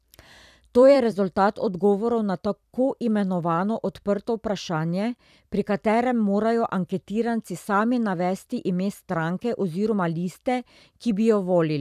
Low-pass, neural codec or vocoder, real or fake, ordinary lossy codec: 14.4 kHz; vocoder, 44.1 kHz, 128 mel bands every 256 samples, BigVGAN v2; fake; none